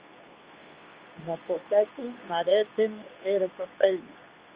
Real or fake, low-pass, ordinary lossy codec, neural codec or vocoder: fake; 3.6 kHz; Opus, 24 kbps; codec, 24 kHz, 0.9 kbps, WavTokenizer, medium speech release version 2